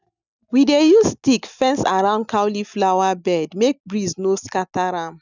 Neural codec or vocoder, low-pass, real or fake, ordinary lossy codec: none; 7.2 kHz; real; none